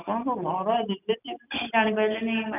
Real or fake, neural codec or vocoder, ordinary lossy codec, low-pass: real; none; none; 3.6 kHz